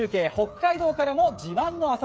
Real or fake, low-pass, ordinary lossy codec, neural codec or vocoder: fake; none; none; codec, 16 kHz, 8 kbps, FreqCodec, smaller model